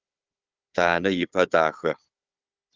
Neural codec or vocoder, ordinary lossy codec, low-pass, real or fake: codec, 16 kHz, 4 kbps, FunCodec, trained on Chinese and English, 50 frames a second; Opus, 24 kbps; 7.2 kHz; fake